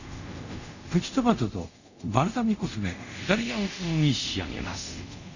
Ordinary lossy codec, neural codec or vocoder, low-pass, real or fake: none; codec, 24 kHz, 0.5 kbps, DualCodec; 7.2 kHz; fake